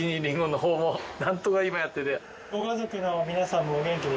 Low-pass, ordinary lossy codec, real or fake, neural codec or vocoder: none; none; real; none